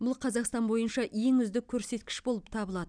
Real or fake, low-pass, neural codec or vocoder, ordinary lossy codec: real; 9.9 kHz; none; none